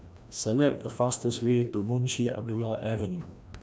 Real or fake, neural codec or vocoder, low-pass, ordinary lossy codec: fake; codec, 16 kHz, 1 kbps, FreqCodec, larger model; none; none